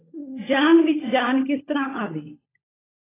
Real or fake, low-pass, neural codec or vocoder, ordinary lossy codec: fake; 3.6 kHz; codec, 16 kHz, 16 kbps, FunCodec, trained on LibriTTS, 50 frames a second; AAC, 16 kbps